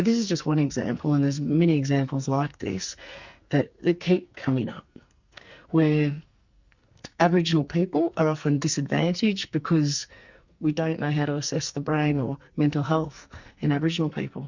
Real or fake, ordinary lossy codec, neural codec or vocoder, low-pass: fake; Opus, 64 kbps; codec, 44.1 kHz, 2.6 kbps, SNAC; 7.2 kHz